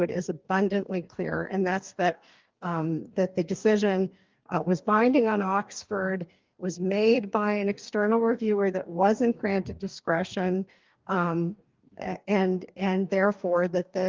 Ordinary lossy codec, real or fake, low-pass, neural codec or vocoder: Opus, 24 kbps; fake; 7.2 kHz; codec, 44.1 kHz, 2.6 kbps, DAC